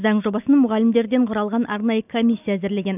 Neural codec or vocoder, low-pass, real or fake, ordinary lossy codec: none; 3.6 kHz; real; none